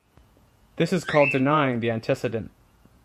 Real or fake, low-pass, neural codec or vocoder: fake; 14.4 kHz; vocoder, 48 kHz, 128 mel bands, Vocos